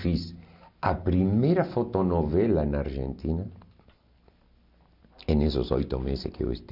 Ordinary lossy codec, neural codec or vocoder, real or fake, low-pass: none; none; real; 5.4 kHz